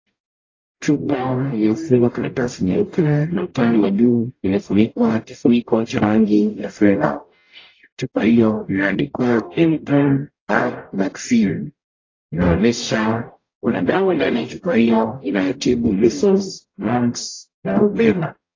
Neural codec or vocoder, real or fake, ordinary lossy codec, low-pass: codec, 44.1 kHz, 0.9 kbps, DAC; fake; AAC, 32 kbps; 7.2 kHz